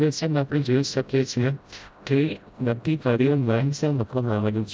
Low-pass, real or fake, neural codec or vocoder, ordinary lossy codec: none; fake; codec, 16 kHz, 0.5 kbps, FreqCodec, smaller model; none